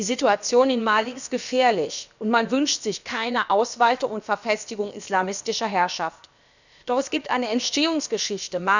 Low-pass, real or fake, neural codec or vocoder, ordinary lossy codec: 7.2 kHz; fake; codec, 16 kHz, about 1 kbps, DyCAST, with the encoder's durations; none